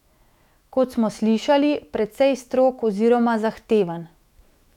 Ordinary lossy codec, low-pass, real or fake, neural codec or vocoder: none; 19.8 kHz; fake; autoencoder, 48 kHz, 128 numbers a frame, DAC-VAE, trained on Japanese speech